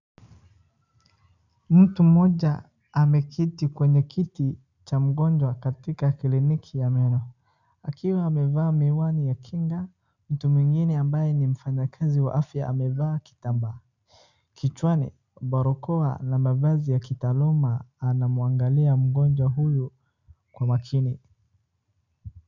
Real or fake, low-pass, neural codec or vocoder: real; 7.2 kHz; none